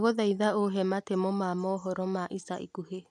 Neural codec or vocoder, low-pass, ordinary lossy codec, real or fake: none; none; none; real